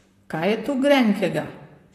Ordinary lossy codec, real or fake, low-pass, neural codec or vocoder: AAC, 48 kbps; fake; 14.4 kHz; vocoder, 44.1 kHz, 128 mel bands, Pupu-Vocoder